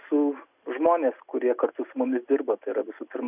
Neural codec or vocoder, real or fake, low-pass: none; real; 3.6 kHz